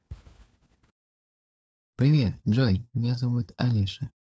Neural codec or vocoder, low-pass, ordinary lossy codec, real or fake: codec, 16 kHz, 4 kbps, FunCodec, trained on LibriTTS, 50 frames a second; none; none; fake